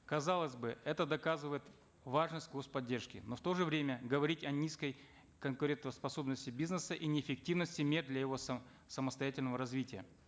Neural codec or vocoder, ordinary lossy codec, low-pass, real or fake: none; none; none; real